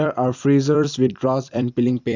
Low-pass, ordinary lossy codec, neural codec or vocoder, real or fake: 7.2 kHz; none; vocoder, 44.1 kHz, 128 mel bands every 256 samples, BigVGAN v2; fake